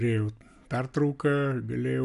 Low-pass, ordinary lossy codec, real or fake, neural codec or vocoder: 10.8 kHz; MP3, 96 kbps; real; none